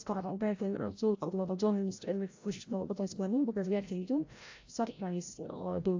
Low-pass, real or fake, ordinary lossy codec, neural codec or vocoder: 7.2 kHz; fake; AAC, 48 kbps; codec, 16 kHz, 0.5 kbps, FreqCodec, larger model